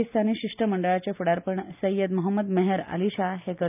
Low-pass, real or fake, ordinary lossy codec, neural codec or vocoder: 3.6 kHz; real; none; none